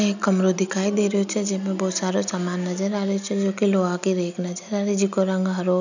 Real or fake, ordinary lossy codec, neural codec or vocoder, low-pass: real; none; none; 7.2 kHz